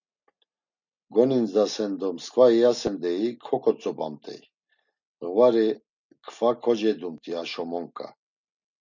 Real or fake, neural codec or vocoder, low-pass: real; none; 7.2 kHz